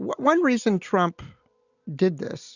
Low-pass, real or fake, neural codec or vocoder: 7.2 kHz; real; none